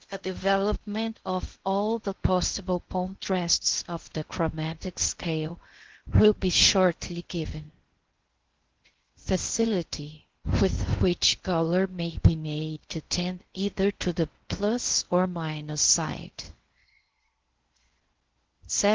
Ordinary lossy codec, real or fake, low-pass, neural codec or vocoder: Opus, 16 kbps; fake; 7.2 kHz; codec, 16 kHz in and 24 kHz out, 0.6 kbps, FocalCodec, streaming, 2048 codes